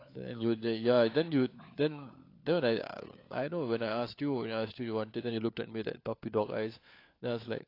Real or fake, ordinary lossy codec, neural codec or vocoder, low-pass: fake; AAC, 32 kbps; codec, 16 kHz, 4 kbps, FunCodec, trained on LibriTTS, 50 frames a second; 5.4 kHz